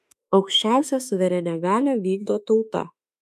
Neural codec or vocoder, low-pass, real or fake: autoencoder, 48 kHz, 32 numbers a frame, DAC-VAE, trained on Japanese speech; 14.4 kHz; fake